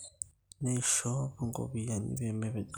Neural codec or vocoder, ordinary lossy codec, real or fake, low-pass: none; none; real; none